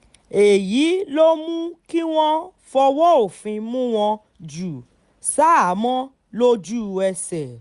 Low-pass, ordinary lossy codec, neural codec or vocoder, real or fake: 10.8 kHz; Opus, 64 kbps; none; real